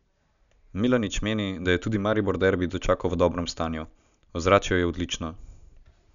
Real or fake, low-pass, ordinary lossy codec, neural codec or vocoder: real; 7.2 kHz; none; none